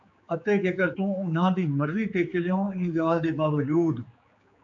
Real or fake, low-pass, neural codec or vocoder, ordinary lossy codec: fake; 7.2 kHz; codec, 16 kHz, 4 kbps, X-Codec, HuBERT features, trained on general audio; AAC, 64 kbps